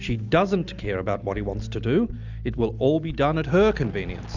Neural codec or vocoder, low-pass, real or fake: none; 7.2 kHz; real